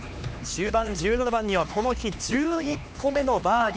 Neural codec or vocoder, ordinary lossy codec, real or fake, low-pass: codec, 16 kHz, 2 kbps, X-Codec, HuBERT features, trained on LibriSpeech; none; fake; none